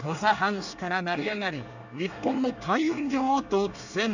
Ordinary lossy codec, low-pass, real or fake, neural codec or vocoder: none; 7.2 kHz; fake; codec, 24 kHz, 1 kbps, SNAC